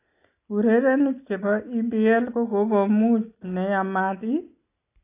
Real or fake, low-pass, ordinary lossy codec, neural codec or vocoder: real; 3.6 kHz; AAC, 24 kbps; none